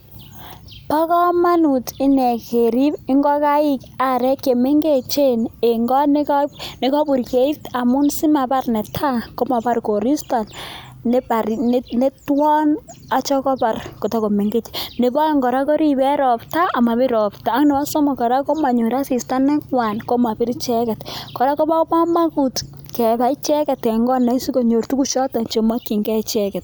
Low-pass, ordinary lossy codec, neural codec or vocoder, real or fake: none; none; none; real